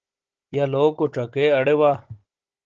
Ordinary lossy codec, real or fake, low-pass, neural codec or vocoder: Opus, 16 kbps; fake; 7.2 kHz; codec, 16 kHz, 16 kbps, FunCodec, trained on Chinese and English, 50 frames a second